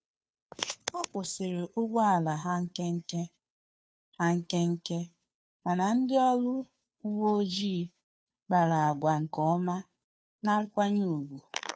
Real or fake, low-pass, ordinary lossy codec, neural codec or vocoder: fake; none; none; codec, 16 kHz, 2 kbps, FunCodec, trained on Chinese and English, 25 frames a second